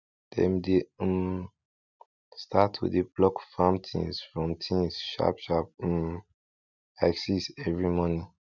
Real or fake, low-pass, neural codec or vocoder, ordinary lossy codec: real; 7.2 kHz; none; none